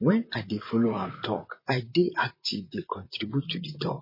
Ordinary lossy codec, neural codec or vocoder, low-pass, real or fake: MP3, 24 kbps; vocoder, 44.1 kHz, 128 mel bands, Pupu-Vocoder; 5.4 kHz; fake